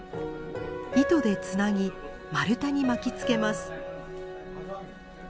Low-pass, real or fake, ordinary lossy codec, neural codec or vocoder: none; real; none; none